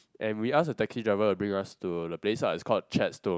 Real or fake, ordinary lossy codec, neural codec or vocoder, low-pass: real; none; none; none